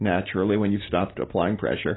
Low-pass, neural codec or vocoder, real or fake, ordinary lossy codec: 7.2 kHz; none; real; AAC, 16 kbps